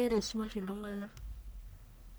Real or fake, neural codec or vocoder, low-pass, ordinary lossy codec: fake; codec, 44.1 kHz, 1.7 kbps, Pupu-Codec; none; none